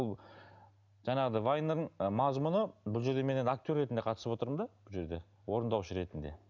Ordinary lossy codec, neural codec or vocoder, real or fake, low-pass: none; none; real; 7.2 kHz